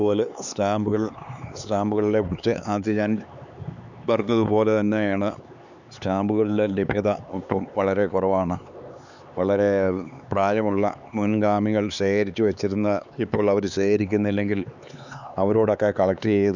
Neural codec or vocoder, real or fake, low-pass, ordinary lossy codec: codec, 16 kHz, 4 kbps, X-Codec, HuBERT features, trained on LibriSpeech; fake; 7.2 kHz; none